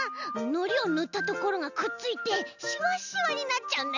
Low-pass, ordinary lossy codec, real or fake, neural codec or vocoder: 7.2 kHz; none; real; none